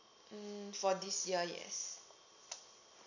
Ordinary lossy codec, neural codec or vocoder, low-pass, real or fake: none; none; 7.2 kHz; real